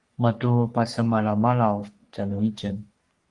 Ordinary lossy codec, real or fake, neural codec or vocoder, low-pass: Opus, 32 kbps; fake; codec, 44.1 kHz, 3.4 kbps, Pupu-Codec; 10.8 kHz